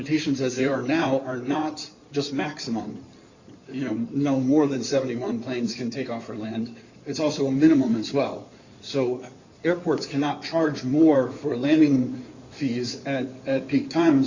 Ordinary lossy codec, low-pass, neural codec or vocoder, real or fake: Opus, 64 kbps; 7.2 kHz; codec, 16 kHz in and 24 kHz out, 2.2 kbps, FireRedTTS-2 codec; fake